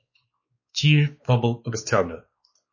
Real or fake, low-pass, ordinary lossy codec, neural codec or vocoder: fake; 7.2 kHz; MP3, 32 kbps; codec, 16 kHz, 2 kbps, X-Codec, WavLM features, trained on Multilingual LibriSpeech